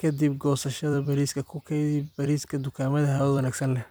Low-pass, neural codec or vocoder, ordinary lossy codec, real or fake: none; vocoder, 44.1 kHz, 128 mel bands every 256 samples, BigVGAN v2; none; fake